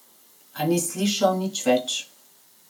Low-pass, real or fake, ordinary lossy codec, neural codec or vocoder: none; real; none; none